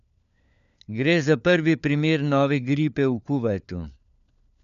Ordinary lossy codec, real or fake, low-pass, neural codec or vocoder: none; fake; 7.2 kHz; codec, 16 kHz, 4 kbps, FunCodec, trained on LibriTTS, 50 frames a second